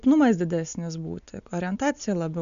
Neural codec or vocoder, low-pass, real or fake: none; 7.2 kHz; real